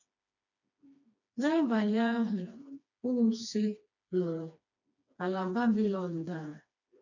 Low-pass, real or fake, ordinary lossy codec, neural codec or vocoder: 7.2 kHz; fake; none; codec, 16 kHz, 2 kbps, FreqCodec, smaller model